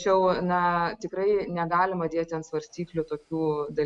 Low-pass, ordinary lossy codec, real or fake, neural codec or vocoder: 10.8 kHz; MP3, 64 kbps; real; none